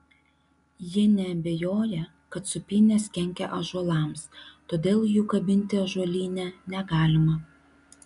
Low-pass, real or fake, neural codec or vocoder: 10.8 kHz; real; none